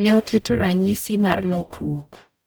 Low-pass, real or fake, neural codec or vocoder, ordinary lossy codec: none; fake; codec, 44.1 kHz, 0.9 kbps, DAC; none